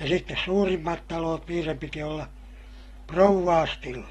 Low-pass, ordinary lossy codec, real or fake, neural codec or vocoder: 19.8 kHz; AAC, 32 kbps; real; none